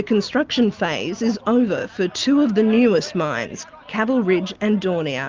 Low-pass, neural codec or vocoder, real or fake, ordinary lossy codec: 7.2 kHz; none; real; Opus, 32 kbps